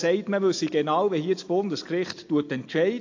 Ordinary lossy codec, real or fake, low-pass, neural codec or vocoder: AAC, 48 kbps; real; 7.2 kHz; none